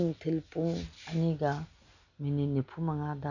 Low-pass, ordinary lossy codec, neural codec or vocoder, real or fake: 7.2 kHz; none; none; real